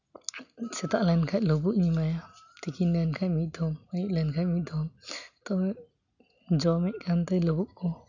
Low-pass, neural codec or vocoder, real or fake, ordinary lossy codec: 7.2 kHz; none; real; none